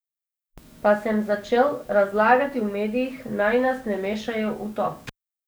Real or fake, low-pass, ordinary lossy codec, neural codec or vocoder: fake; none; none; codec, 44.1 kHz, 7.8 kbps, DAC